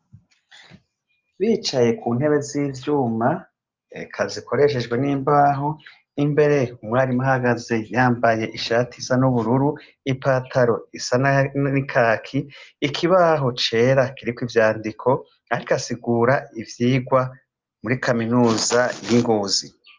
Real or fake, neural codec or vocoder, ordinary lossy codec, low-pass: real; none; Opus, 24 kbps; 7.2 kHz